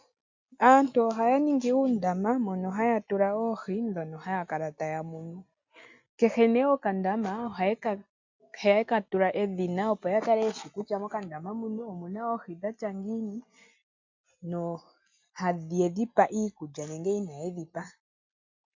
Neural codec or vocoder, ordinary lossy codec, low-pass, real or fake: none; MP3, 64 kbps; 7.2 kHz; real